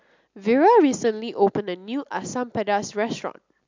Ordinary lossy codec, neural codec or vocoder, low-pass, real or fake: MP3, 64 kbps; none; 7.2 kHz; real